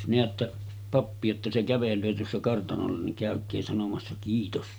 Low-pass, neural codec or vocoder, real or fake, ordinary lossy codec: none; none; real; none